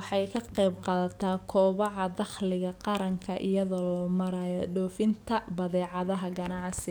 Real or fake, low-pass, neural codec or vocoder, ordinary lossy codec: fake; none; codec, 44.1 kHz, 7.8 kbps, DAC; none